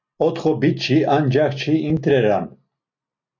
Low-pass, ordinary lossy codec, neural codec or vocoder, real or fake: 7.2 kHz; MP3, 48 kbps; none; real